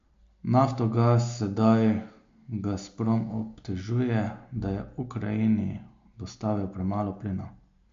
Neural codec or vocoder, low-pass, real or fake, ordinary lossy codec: none; 7.2 kHz; real; MP3, 48 kbps